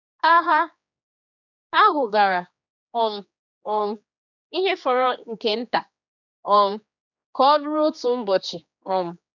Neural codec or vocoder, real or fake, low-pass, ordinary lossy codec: codec, 16 kHz, 2 kbps, X-Codec, HuBERT features, trained on general audio; fake; 7.2 kHz; none